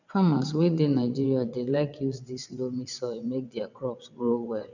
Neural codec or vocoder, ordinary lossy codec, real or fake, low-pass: vocoder, 22.05 kHz, 80 mel bands, WaveNeXt; none; fake; 7.2 kHz